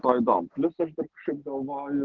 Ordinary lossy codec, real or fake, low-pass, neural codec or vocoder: Opus, 16 kbps; real; 7.2 kHz; none